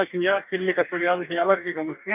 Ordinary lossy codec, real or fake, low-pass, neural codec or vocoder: none; fake; 3.6 kHz; codec, 44.1 kHz, 2.6 kbps, DAC